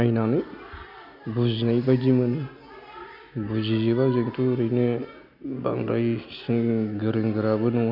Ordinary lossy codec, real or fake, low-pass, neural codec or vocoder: none; real; 5.4 kHz; none